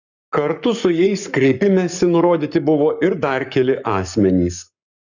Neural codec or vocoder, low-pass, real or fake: codec, 44.1 kHz, 7.8 kbps, Pupu-Codec; 7.2 kHz; fake